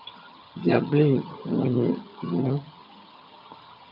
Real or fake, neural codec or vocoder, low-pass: fake; vocoder, 22.05 kHz, 80 mel bands, HiFi-GAN; 5.4 kHz